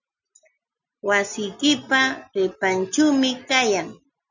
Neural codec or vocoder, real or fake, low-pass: none; real; 7.2 kHz